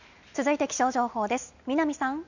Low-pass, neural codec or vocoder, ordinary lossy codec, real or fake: 7.2 kHz; none; none; real